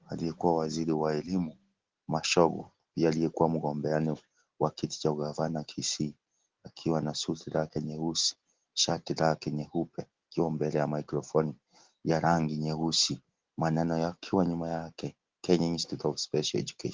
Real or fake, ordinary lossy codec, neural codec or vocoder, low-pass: real; Opus, 16 kbps; none; 7.2 kHz